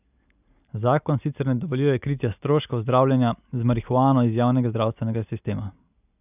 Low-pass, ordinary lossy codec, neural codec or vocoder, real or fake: 3.6 kHz; none; none; real